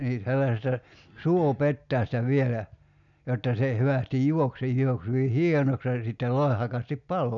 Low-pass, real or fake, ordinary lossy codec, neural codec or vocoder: 7.2 kHz; real; none; none